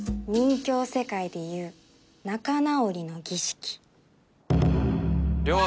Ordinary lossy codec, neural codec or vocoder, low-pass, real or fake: none; none; none; real